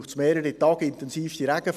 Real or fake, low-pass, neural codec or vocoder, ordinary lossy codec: real; 14.4 kHz; none; none